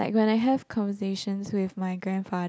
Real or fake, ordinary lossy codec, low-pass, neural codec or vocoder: real; none; none; none